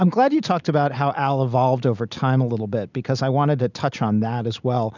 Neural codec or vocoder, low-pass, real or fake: none; 7.2 kHz; real